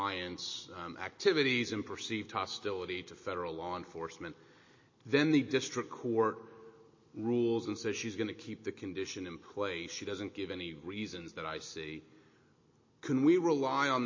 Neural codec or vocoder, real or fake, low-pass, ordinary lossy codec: none; real; 7.2 kHz; MP3, 32 kbps